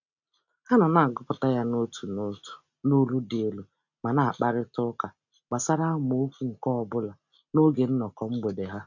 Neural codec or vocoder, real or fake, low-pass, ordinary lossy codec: none; real; 7.2 kHz; none